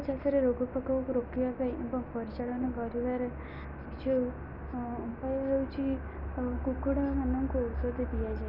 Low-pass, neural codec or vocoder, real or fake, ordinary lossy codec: 5.4 kHz; none; real; none